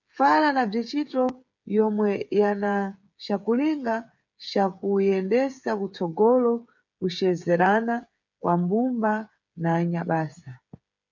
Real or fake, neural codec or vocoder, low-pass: fake; codec, 16 kHz, 8 kbps, FreqCodec, smaller model; 7.2 kHz